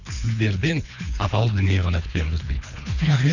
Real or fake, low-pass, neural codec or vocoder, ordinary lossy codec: fake; 7.2 kHz; codec, 24 kHz, 3 kbps, HILCodec; none